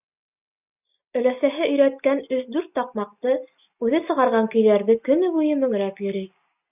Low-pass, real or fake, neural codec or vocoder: 3.6 kHz; real; none